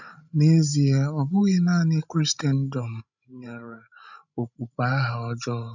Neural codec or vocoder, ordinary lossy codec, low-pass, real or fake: codec, 16 kHz, 16 kbps, FreqCodec, larger model; none; 7.2 kHz; fake